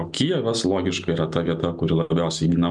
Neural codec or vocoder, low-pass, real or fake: none; 10.8 kHz; real